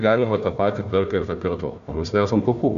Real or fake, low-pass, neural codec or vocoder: fake; 7.2 kHz; codec, 16 kHz, 1 kbps, FunCodec, trained on Chinese and English, 50 frames a second